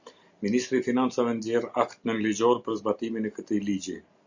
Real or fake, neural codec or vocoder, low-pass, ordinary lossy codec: real; none; 7.2 kHz; Opus, 64 kbps